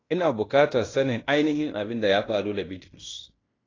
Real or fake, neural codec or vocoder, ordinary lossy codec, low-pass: fake; codec, 16 kHz in and 24 kHz out, 0.9 kbps, LongCat-Audio-Codec, fine tuned four codebook decoder; AAC, 32 kbps; 7.2 kHz